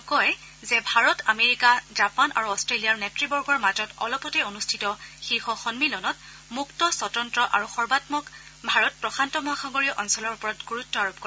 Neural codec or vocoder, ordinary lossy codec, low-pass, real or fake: none; none; none; real